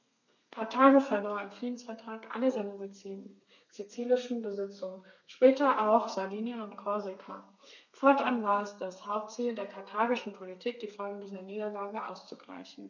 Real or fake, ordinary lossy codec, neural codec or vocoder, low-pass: fake; none; codec, 32 kHz, 1.9 kbps, SNAC; 7.2 kHz